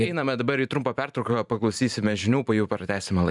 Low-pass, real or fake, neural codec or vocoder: 10.8 kHz; fake; vocoder, 44.1 kHz, 128 mel bands every 512 samples, BigVGAN v2